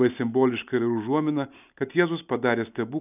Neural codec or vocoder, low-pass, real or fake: none; 3.6 kHz; real